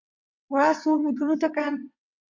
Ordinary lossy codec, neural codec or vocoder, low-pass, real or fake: MP3, 48 kbps; vocoder, 22.05 kHz, 80 mel bands, WaveNeXt; 7.2 kHz; fake